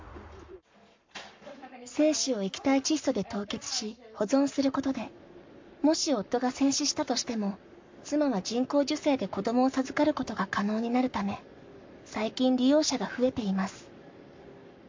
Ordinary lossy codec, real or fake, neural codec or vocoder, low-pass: MP3, 48 kbps; fake; codec, 44.1 kHz, 7.8 kbps, Pupu-Codec; 7.2 kHz